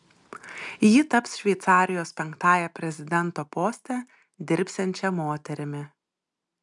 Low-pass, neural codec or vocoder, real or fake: 10.8 kHz; none; real